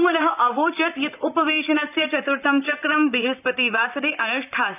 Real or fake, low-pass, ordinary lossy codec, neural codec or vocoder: fake; 3.6 kHz; none; vocoder, 44.1 kHz, 128 mel bands, Pupu-Vocoder